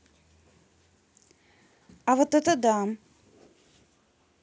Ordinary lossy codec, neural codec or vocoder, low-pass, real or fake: none; none; none; real